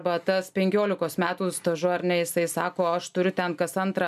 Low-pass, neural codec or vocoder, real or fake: 14.4 kHz; none; real